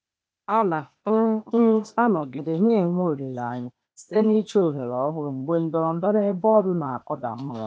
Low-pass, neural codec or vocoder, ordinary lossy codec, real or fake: none; codec, 16 kHz, 0.8 kbps, ZipCodec; none; fake